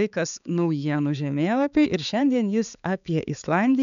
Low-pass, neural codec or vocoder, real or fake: 7.2 kHz; codec, 16 kHz, 4 kbps, X-Codec, HuBERT features, trained on balanced general audio; fake